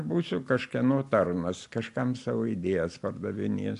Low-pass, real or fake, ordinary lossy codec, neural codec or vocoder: 10.8 kHz; real; AAC, 64 kbps; none